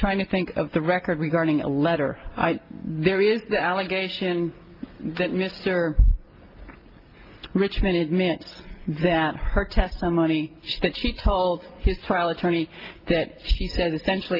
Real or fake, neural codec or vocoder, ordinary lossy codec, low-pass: real; none; Opus, 32 kbps; 5.4 kHz